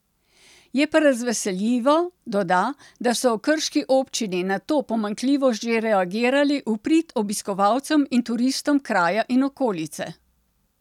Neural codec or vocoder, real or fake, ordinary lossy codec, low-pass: none; real; none; 19.8 kHz